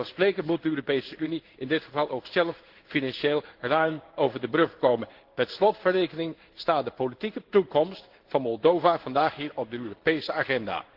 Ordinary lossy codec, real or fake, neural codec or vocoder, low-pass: Opus, 16 kbps; fake; codec, 16 kHz in and 24 kHz out, 1 kbps, XY-Tokenizer; 5.4 kHz